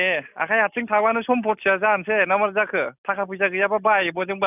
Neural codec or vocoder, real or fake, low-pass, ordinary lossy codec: none; real; 3.6 kHz; none